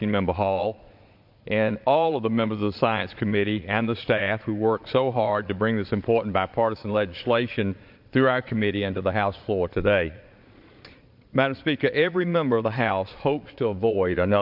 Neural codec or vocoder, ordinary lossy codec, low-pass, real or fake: vocoder, 22.05 kHz, 80 mel bands, Vocos; MP3, 48 kbps; 5.4 kHz; fake